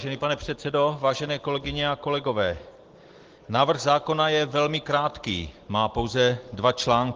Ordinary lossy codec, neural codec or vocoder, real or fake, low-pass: Opus, 16 kbps; none; real; 7.2 kHz